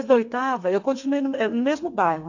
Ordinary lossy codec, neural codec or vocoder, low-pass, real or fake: AAC, 48 kbps; codec, 32 kHz, 1.9 kbps, SNAC; 7.2 kHz; fake